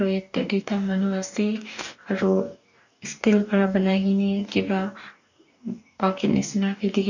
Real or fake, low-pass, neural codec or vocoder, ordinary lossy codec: fake; 7.2 kHz; codec, 44.1 kHz, 2.6 kbps, DAC; none